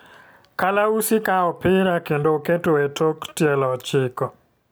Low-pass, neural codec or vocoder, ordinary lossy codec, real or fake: none; none; none; real